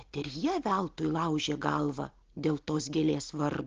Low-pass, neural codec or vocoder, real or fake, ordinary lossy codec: 7.2 kHz; none; real; Opus, 16 kbps